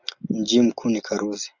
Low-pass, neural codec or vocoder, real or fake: 7.2 kHz; none; real